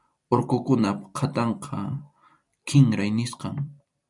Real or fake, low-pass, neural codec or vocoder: fake; 10.8 kHz; vocoder, 44.1 kHz, 128 mel bands every 256 samples, BigVGAN v2